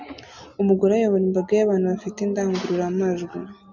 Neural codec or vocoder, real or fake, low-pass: none; real; 7.2 kHz